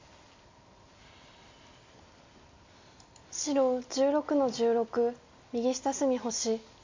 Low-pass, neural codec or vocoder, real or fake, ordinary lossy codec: 7.2 kHz; none; real; MP3, 64 kbps